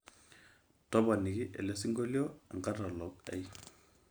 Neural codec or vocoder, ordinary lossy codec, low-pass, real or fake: none; none; none; real